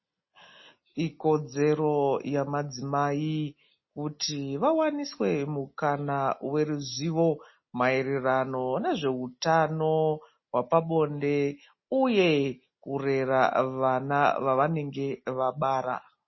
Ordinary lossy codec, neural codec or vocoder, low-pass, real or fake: MP3, 24 kbps; none; 7.2 kHz; real